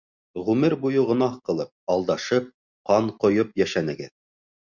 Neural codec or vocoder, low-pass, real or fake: none; 7.2 kHz; real